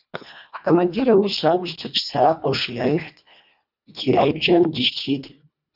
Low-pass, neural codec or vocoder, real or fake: 5.4 kHz; codec, 24 kHz, 1.5 kbps, HILCodec; fake